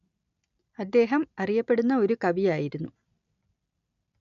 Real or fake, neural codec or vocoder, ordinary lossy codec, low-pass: real; none; none; 7.2 kHz